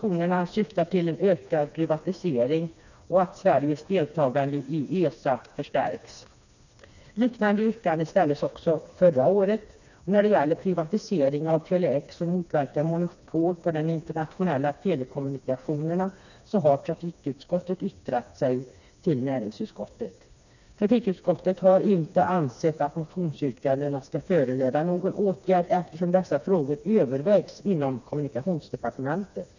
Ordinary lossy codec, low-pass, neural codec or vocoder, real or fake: none; 7.2 kHz; codec, 16 kHz, 2 kbps, FreqCodec, smaller model; fake